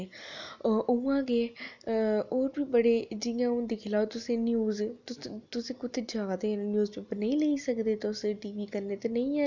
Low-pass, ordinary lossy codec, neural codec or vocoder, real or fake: 7.2 kHz; none; none; real